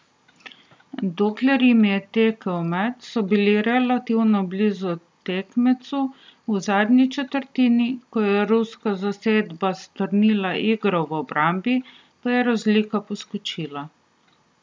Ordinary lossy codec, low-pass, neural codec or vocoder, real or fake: none; none; none; real